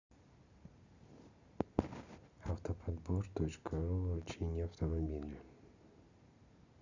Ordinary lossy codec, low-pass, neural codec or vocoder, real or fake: Opus, 64 kbps; 7.2 kHz; none; real